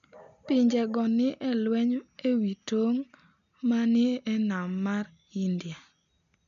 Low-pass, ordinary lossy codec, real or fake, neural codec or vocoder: 7.2 kHz; MP3, 96 kbps; real; none